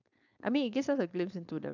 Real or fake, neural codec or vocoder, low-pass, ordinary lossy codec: fake; codec, 16 kHz, 4.8 kbps, FACodec; 7.2 kHz; none